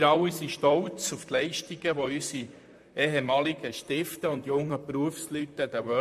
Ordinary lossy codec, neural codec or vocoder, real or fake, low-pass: MP3, 64 kbps; vocoder, 44.1 kHz, 128 mel bands, Pupu-Vocoder; fake; 14.4 kHz